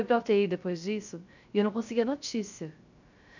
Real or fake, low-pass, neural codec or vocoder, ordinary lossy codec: fake; 7.2 kHz; codec, 16 kHz, 0.3 kbps, FocalCodec; none